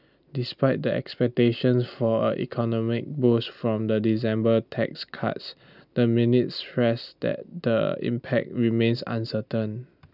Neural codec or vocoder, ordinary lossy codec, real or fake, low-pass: none; none; real; 5.4 kHz